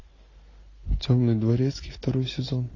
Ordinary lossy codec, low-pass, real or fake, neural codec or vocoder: AAC, 32 kbps; 7.2 kHz; real; none